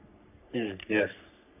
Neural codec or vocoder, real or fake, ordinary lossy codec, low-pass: codec, 44.1 kHz, 3.4 kbps, Pupu-Codec; fake; none; 3.6 kHz